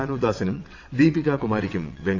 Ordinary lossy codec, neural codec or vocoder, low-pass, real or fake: AAC, 48 kbps; vocoder, 22.05 kHz, 80 mel bands, WaveNeXt; 7.2 kHz; fake